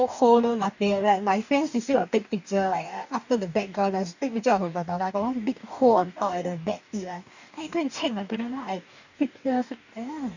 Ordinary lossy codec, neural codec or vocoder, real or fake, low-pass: none; codec, 44.1 kHz, 2.6 kbps, DAC; fake; 7.2 kHz